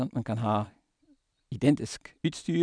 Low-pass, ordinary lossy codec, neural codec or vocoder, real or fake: 9.9 kHz; none; none; real